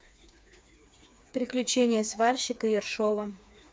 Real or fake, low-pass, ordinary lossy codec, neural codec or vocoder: fake; none; none; codec, 16 kHz, 4 kbps, FreqCodec, smaller model